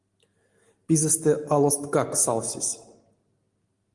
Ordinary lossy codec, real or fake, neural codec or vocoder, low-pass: Opus, 24 kbps; real; none; 10.8 kHz